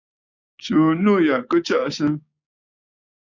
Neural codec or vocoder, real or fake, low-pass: codec, 16 kHz, 6 kbps, DAC; fake; 7.2 kHz